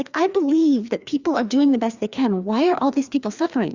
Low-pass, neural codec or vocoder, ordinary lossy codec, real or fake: 7.2 kHz; codec, 16 kHz, 2 kbps, FreqCodec, larger model; Opus, 64 kbps; fake